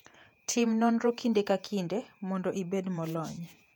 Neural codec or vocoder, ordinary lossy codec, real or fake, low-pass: vocoder, 48 kHz, 128 mel bands, Vocos; none; fake; 19.8 kHz